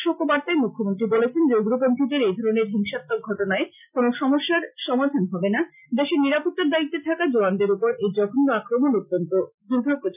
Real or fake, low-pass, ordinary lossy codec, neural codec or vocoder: real; 3.6 kHz; none; none